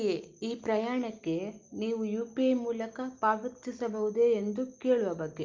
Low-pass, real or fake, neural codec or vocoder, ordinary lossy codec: 7.2 kHz; real; none; Opus, 32 kbps